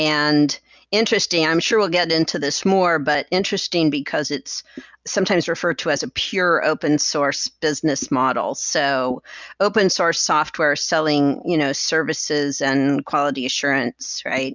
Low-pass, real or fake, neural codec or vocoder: 7.2 kHz; real; none